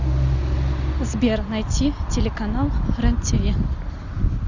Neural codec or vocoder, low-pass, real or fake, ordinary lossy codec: none; 7.2 kHz; real; Opus, 64 kbps